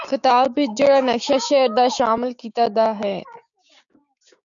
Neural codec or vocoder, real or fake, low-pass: codec, 16 kHz, 6 kbps, DAC; fake; 7.2 kHz